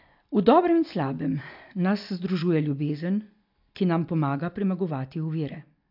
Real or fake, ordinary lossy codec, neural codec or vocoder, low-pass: real; AAC, 48 kbps; none; 5.4 kHz